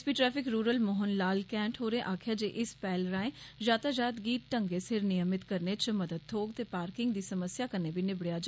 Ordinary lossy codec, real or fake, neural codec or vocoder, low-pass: none; real; none; none